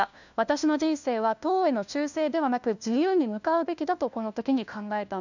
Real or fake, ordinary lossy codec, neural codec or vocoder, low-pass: fake; none; codec, 16 kHz, 1 kbps, FunCodec, trained on LibriTTS, 50 frames a second; 7.2 kHz